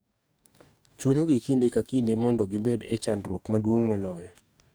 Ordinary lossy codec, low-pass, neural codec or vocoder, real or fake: none; none; codec, 44.1 kHz, 2.6 kbps, DAC; fake